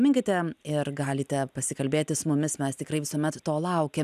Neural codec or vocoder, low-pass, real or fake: none; 14.4 kHz; real